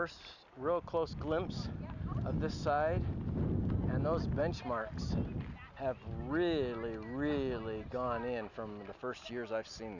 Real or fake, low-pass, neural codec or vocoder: real; 7.2 kHz; none